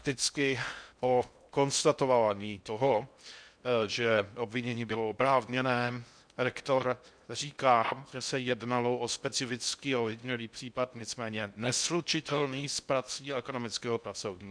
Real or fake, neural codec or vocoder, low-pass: fake; codec, 16 kHz in and 24 kHz out, 0.6 kbps, FocalCodec, streaming, 2048 codes; 9.9 kHz